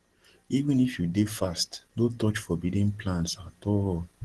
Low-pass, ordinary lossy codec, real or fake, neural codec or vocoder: 10.8 kHz; Opus, 16 kbps; real; none